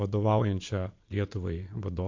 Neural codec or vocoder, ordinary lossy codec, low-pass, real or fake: vocoder, 22.05 kHz, 80 mel bands, Vocos; MP3, 48 kbps; 7.2 kHz; fake